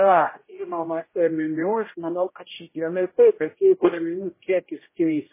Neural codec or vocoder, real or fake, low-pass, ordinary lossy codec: codec, 16 kHz, 0.5 kbps, X-Codec, HuBERT features, trained on general audio; fake; 3.6 kHz; MP3, 16 kbps